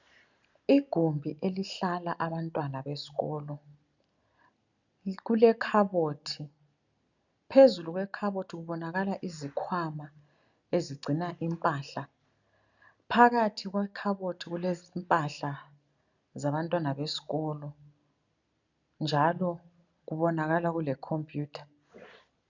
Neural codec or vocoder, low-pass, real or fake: none; 7.2 kHz; real